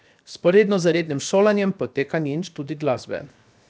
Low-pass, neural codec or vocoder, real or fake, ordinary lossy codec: none; codec, 16 kHz, 0.7 kbps, FocalCodec; fake; none